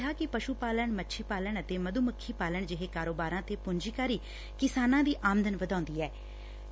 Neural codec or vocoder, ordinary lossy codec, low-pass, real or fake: none; none; none; real